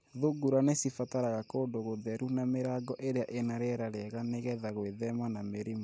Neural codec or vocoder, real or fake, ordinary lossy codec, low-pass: none; real; none; none